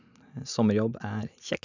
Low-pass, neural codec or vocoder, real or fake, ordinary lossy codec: 7.2 kHz; none; real; none